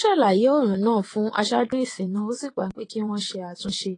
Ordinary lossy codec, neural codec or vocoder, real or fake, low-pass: AAC, 32 kbps; vocoder, 22.05 kHz, 80 mel bands, WaveNeXt; fake; 9.9 kHz